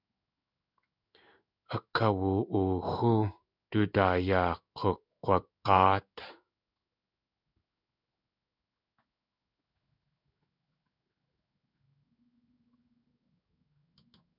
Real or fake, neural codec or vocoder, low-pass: fake; codec, 16 kHz in and 24 kHz out, 1 kbps, XY-Tokenizer; 5.4 kHz